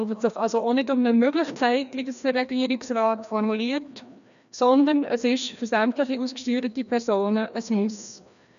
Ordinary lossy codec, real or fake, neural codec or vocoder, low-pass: none; fake; codec, 16 kHz, 1 kbps, FreqCodec, larger model; 7.2 kHz